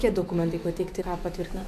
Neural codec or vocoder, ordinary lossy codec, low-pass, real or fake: autoencoder, 48 kHz, 128 numbers a frame, DAC-VAE, trained on Japanese speech; MP3, 64 kbps; 14.4 kHz; fake